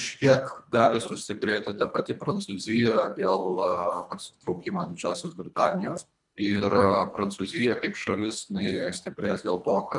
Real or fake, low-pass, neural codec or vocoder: fake; 10.8 kHz; codec, 24 kHz, 1.5 kbps, HILCodec